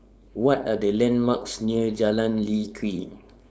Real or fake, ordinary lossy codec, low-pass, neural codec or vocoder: fake; none; none; codec, 16 kHz, 4.8 kbps, FACodec